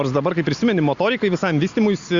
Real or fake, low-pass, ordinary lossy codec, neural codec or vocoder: real; 7.2 kHz; Opus, 64 kbps; none